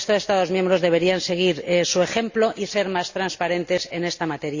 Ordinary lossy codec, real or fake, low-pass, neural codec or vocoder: Opus, 64 kbps; real; 7.2 kHz; none